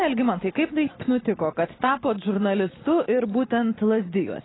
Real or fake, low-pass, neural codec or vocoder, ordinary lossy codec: real; 7.2 kHz; none; AAC, 16 kbps